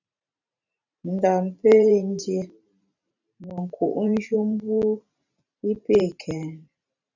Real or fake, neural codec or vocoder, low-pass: fake; vocoder, 44.1 kHz, 128 mel bands every 512 samples, BigVGAN v2; 7.2 kHz